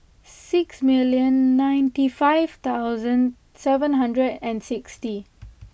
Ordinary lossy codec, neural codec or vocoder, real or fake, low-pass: none; none; real; none